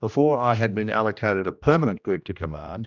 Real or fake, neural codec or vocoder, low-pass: fake; codec, 16 kHz, 1 kbps, X-Codec, HuBERT features, trained on general audio; 7.2 kHz